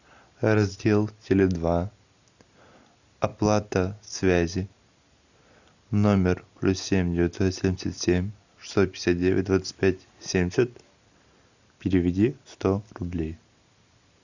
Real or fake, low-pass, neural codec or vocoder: real; 7.2 kHz; none